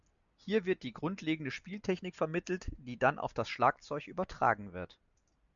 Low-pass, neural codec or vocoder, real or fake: 7.2 kHz; none; real